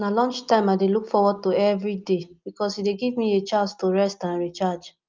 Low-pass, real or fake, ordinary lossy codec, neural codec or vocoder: 7.2 kHz; real; Opus, 24 kbps; none